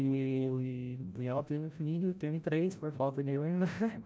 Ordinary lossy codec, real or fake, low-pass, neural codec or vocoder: none; fake; none; codec, 16 kHz, 0.5 kbps, FreqCodec, larger model